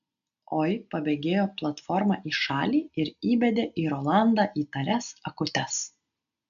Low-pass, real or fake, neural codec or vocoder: 7.2 kHz; real; none